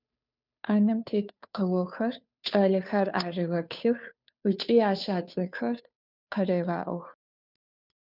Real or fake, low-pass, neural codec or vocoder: fake; 5.4 kHz; codec, 16 kHz, 2 kbps, FunCodec, trained on Chinese and English, 25 frames a second